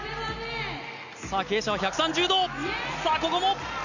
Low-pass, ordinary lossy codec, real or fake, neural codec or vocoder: 7.2 kHz; none; real; none